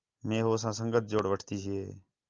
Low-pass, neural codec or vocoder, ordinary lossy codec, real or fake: 7.2 kHz; none; Opus, 24 kbps; real